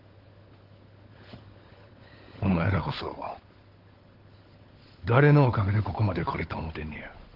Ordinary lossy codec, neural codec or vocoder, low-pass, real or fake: Opus, 16 kbps; codec, 16 kHz, 16 kbps, FunCodec, trained on LibriTTS, 50 frames a second; 5.4 kHz; fake